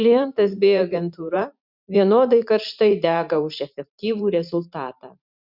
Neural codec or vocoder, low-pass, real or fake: vocoder, 44.1 kHz, 128 mel bands every 512 samples, BigVGAN v2; 5.4 kHz; fake